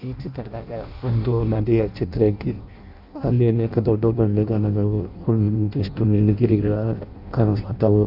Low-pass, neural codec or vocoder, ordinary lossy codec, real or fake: 5.4 kHz; codec, 16 kHz in and 24 kHz out, 0.6 kbps, FireRedTTS-2 codec; AAC, 48 kbps; fake